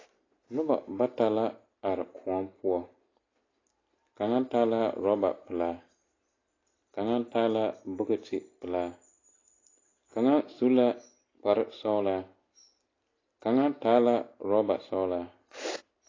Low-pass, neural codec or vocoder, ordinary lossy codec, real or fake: 7.2 kHz; none; AAC, 32 kbps; real